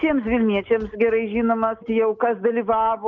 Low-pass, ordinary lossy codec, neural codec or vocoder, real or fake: 7.2 kHz; Opus, 24 kbps; none; real